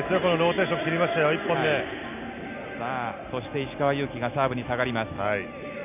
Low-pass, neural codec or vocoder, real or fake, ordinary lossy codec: 3.6 kHz; none; real; none